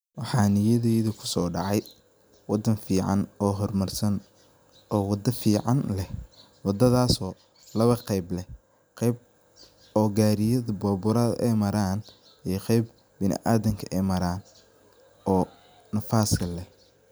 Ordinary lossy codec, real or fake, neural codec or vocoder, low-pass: none; real; none; none